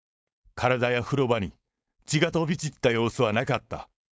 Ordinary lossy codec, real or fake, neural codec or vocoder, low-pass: none; fake; codec, 16 kHz, 4.8 kbps, FACodec; none